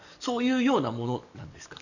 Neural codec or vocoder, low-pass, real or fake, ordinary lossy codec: codec, 44.1 kHz, 7.8 kbps, DAC; 7.2 kHz; fake; none